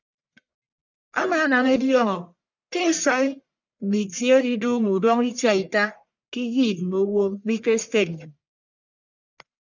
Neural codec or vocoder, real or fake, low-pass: codec, 44.1 kHz, 1.7 kbps, Pupu-Codec; fake; 7.2 kHz